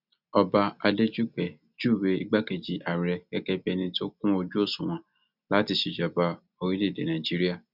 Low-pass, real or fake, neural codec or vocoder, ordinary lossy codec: 5.4 kHz; real; none; none